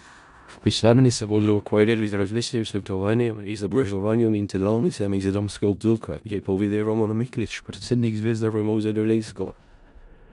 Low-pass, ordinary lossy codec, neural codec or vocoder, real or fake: 10.8 kHz; none; codec, 16 kHz in and 24 kHz out, 0.4 kbps, LongCat-Audio-Codec, four codebook decoder; fake